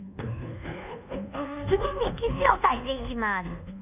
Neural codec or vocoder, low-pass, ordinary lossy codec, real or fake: codec, 24 kHz, 1.2 kbps, DualCodec; 3.6 kHz; none; fake